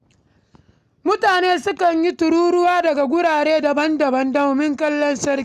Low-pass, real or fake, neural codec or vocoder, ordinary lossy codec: 14.4 kHz; real; none; none